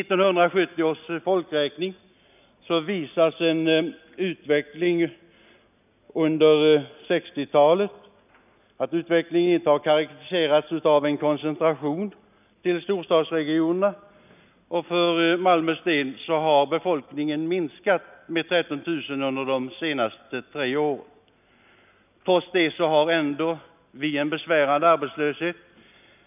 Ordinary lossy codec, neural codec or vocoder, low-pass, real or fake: none; none; 3.6 kHz; real